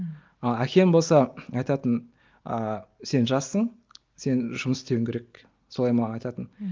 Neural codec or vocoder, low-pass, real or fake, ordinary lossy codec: none; 7.2 kHz; real; Opus, 24 kbps